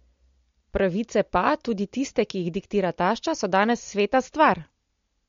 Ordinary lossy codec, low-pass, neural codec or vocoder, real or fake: MP3, 48 kbps; 7.2 kHz; none; real